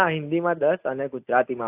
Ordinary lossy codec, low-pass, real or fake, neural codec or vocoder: AAC, 32 kbps; 3.6 kHz; real; none